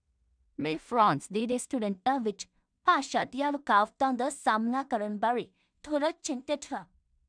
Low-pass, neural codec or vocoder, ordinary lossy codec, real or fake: 9.9 kHz; codec, 16 kHz in and 24 kHz out, 0.4 kbps, LongCat-Audio-Codec, two codebook decoder; none; fake